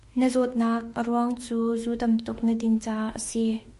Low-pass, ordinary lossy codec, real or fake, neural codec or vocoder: 14.4 kHz; MP3, 48 kbps; fake; autoencoder, 48 kHz, 32 numbers a frame, DAC-VAE, trained on Japanese speech